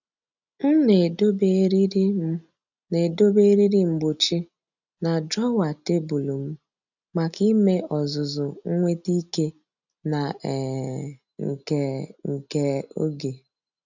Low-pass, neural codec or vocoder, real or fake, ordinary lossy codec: 7.2 kHz; none; real; none